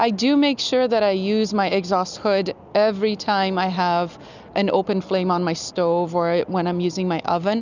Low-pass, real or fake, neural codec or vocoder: 7.2 kHz; real; none